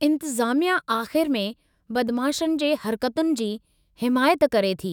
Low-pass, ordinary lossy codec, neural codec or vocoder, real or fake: none; none; autoencoder, 48 kHz, 128 numbers a frame, DAC-VAE, trained on Japanese speech; fake